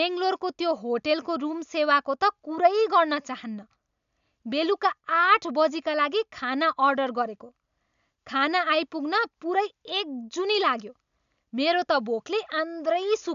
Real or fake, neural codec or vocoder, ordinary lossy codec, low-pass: real; none; none; 7.2 kHz